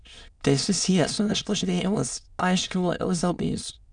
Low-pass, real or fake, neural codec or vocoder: 9.9 kHz; fake; autoencoder, 22.05 kHz, a latent of 192 numbers a frame, VITS, trained on many speakers